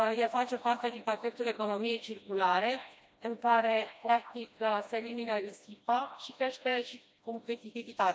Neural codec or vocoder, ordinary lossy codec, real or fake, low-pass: codec, 16 kHz, 1 kbps, FreqCodec, smaller model; none; fake; none